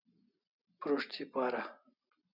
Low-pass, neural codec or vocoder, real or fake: 5.4 kHz; none; real